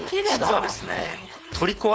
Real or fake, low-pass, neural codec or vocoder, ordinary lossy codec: fake; none; codec, 16 kHz, 4.8 kbps, FACodec; none